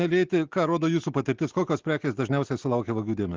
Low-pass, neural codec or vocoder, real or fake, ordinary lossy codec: 7.2 kHz; none; real; Opus, 16 kbps